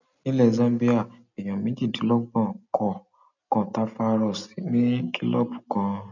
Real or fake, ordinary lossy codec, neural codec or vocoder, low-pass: real; none; none; 7.2 kHz